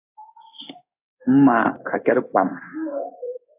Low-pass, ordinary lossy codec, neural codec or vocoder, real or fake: 3.6 kHz; AAC, 32 kbps; codec, 16 kHz in and 24 kHz out, 1 kbps, XY-Tokenizer; fake